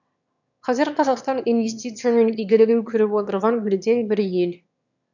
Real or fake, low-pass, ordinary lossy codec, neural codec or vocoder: fake; 7.2 kHz; none; autoencoder, 22.05 kHz, a latent of 192 numbers a frame, VITS, trained on one speaker